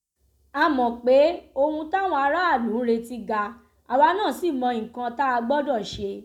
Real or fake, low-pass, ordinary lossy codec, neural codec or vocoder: real; 19.8 kHz; none; none